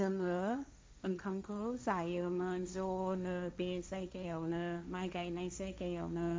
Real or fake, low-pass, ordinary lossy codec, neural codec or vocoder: fake; none; none; codec, 16 kHz, 1.1 kbps, Voila-Tokenizer